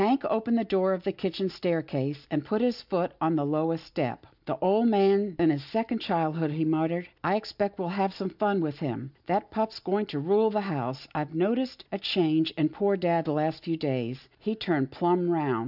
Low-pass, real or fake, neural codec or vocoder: 5.4 kHz; real; none